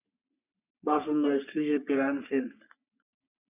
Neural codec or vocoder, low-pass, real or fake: codec, 44.1 kHz, 3.4 kbps, Pupu-Codec; 3.6 kHz; fake